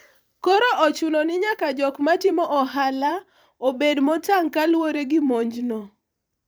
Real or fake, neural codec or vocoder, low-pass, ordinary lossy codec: fake; vocoder, 44.1 kHz, 128 mel bands every 512 samples, BigVGAN v2; none; none